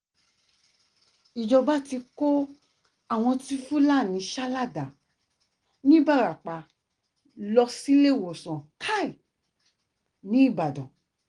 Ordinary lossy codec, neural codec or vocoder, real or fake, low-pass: Opus, 24 kbps; none; real; 9.9 kHz